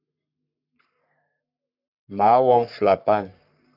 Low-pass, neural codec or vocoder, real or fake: 5.4 kHz; codec, 44.1 kHz, 3.4 kbps, Pupu-Codec; fake